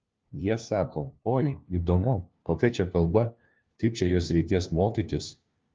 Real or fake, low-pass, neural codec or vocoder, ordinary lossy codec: fake; 7.2 kHz; codec, 16 kHz, 1 kbps, FunCodec, trained on LibriTTS, 50 frames a second; Opus, 24 kbps